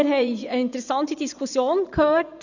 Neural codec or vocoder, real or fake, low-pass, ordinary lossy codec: vocoder, 24 kHz, 100 mel bands, Vocos; fake; 7.2 kHz; none